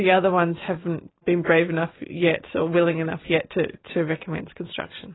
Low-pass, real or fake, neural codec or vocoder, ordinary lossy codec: 7.2 kHz; real; none; AAC, 16 kbps